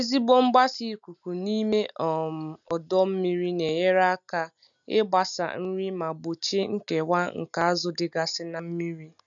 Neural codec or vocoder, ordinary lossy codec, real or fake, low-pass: none; none; real; 7.2 kHz